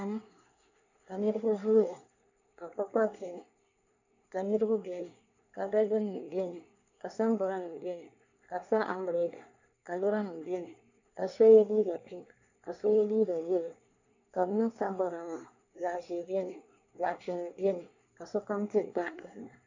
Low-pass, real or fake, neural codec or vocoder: 7.2 kHz; fake; codec, 24 kHz, 1 kbps, SNAC